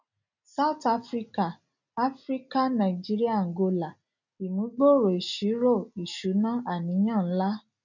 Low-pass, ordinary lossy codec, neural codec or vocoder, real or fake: 7.2 kHz; none; none; real